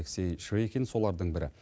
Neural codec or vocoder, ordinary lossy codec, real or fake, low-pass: none; none; real; none